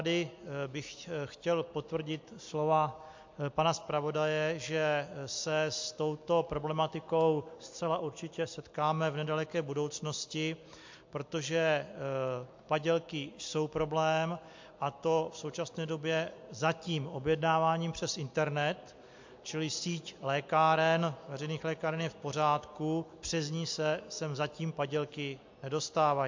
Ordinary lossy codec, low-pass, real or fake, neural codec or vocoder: MP3, 48 kbps; 7.2 kHz; real; none